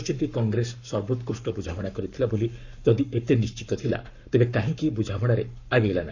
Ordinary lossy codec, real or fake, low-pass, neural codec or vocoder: none; fake; 7.2 kHz; codec, 44.1 kHz, 7.8 kbps, Pupu-Codec